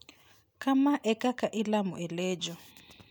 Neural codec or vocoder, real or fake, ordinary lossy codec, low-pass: none; real; none; none